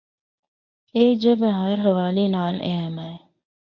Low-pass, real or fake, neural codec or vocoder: 7.2 kHz; fake; codec, 24 kHz, 0.9 kbps, WavTokenizer, medium speech release version 1